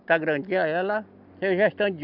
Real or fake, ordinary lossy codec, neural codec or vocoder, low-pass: real; none; none; 5.4 kHz